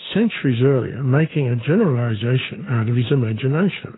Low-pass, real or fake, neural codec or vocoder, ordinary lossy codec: 7.2 kHz; fake; codec, 16 kHz, 2 kbps, FunCodec, trained on Chinese and English, 25 frames a second; AAC, 16 kbps